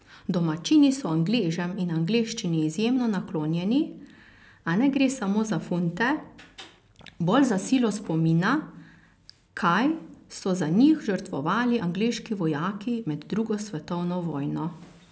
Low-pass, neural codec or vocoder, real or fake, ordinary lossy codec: none; none; real; none